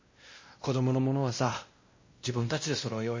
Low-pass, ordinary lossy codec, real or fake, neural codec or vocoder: 7.2 kHz; MP3, 32 kbps; fake; codec, 16 kHz, 1 kbps, X-Codec, WavLM features, trained on Multilingual LibriSpeech